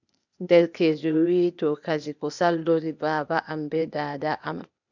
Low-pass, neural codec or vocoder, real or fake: 7.2 kHz; codec, 16 kHz, 0.8 kbps, ZipCodec; fake